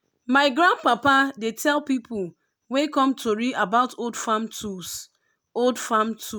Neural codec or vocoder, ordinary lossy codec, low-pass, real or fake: none; none; none; real